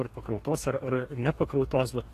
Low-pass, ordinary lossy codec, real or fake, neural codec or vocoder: 14.4 kHz; AAC, 48 kbps; fake; codec, 44.1 kHz, 2.6 kbps, DAC